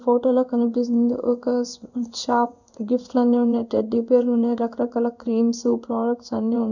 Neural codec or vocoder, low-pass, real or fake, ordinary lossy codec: codec, 16 kHz in and 24 kHz out, 1 kbps, XY-Tokenizer; 7.2 kHz; fake; none